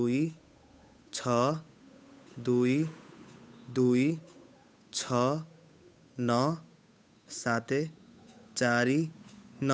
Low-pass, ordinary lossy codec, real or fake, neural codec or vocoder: none; none; fake; codec, 16 kHz, 8 kbps, FunCodec, trained on Chinese and English, 25 frames a second